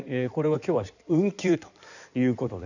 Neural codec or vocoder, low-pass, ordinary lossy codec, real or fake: codec, 16 kHz in and 24 kHz out, 2.2 kbps, FireRedTTS-2 codec; 7.2 kHz; none; fake